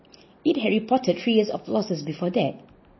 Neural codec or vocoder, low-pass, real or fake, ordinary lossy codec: none; 7.2 kHz; real; MP3, 24 kbps